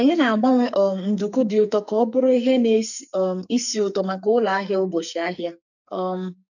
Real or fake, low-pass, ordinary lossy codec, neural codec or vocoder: fake; 7.2 kHz; none; codec, 44.1 kHz, 2.6 kbps, SNAC